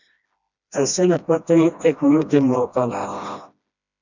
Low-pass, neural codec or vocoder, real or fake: 7.2 kHz; codec, 16 kHz, 1 kbps, FreqCodec, smaller model; fake